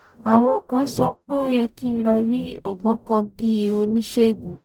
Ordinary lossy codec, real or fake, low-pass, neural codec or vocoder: none; fake; 19.8 kHz; codec, 44.1 kHz, 0.9 kbps, DAC